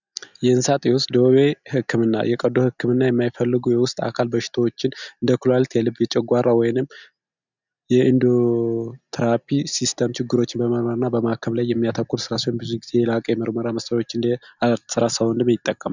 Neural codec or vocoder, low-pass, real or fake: none; 7.2 kHz; real